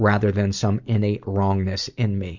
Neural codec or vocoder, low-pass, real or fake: none; 7.2 kHz; real